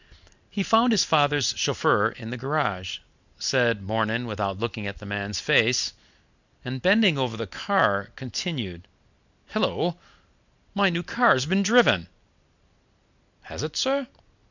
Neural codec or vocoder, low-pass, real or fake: none; 7.2 kHz; real